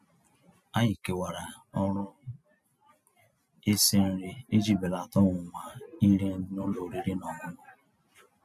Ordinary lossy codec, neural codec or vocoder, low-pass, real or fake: none; none; 14.4 kHz; real